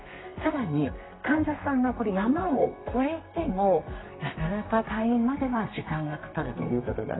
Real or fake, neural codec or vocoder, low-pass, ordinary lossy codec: fake; codec, 32 kHz, 1.9 kbps, SNAC; 7.2 kHz; AAC, 16 kbps